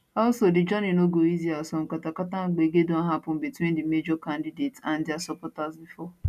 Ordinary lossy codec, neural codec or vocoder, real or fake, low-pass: none; none; real; 14.4 kHz